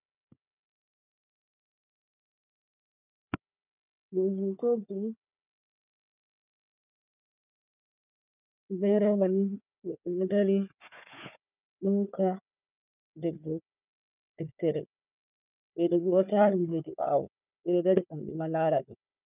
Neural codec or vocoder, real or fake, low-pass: codec, 16 kHz, 4 kbps, FunCodec, trained on Chinese and English, 50 frames a second; fake; 3.6 kHz